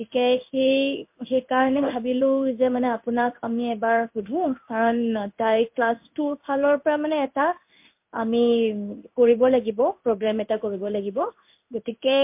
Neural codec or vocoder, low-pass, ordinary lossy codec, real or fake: codec, 16 kHz in and 24 kHz out, 1 kbps, XY-Tokenizer; 3.6 kHz; MP3, 32 kbps; fake